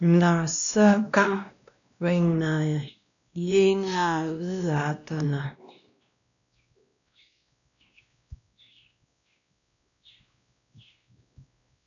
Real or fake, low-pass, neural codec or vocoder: fake; 7.2 kHz; codec, 16 kHz, 1 kbps, X-Codec, WavLM features, trained on Multilingual LibriSpeech